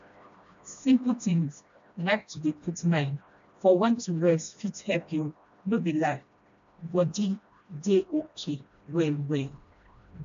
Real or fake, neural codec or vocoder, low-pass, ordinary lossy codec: fake; codec, 16 kHz, 1 kbps, FreqCodec, smaller model; 7.2 kHz; AAC, 64 kbps